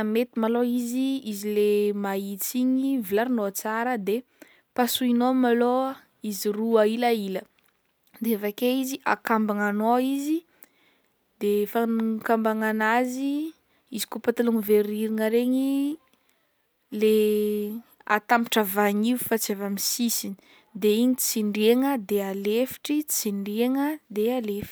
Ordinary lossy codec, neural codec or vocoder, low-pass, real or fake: none; none; none; real